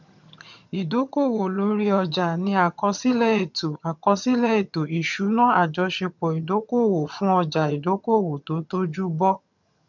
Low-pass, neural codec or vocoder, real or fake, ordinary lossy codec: 7.2 kHz; vocoder, 22.05 kHz, 80 mel bands, HiFi-GAN; fake; none